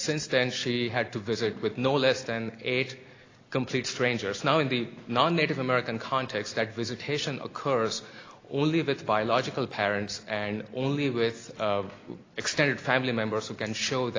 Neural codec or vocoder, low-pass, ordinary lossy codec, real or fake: none; 7.2 kHz; AAC, 32 kbps; real